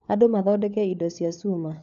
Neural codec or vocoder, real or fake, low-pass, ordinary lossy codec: codec, 16 kHz, 4 kbps, FunCodec, trained on LibriTTS, 50 frames a second; fake; 7.2 kHz; none